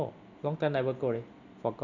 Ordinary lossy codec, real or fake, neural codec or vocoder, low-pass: none; real; none; 7.2 kHz